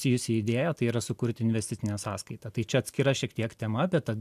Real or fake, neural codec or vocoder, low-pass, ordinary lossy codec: real; none; 14.4 kHz; MP3, 96 kbps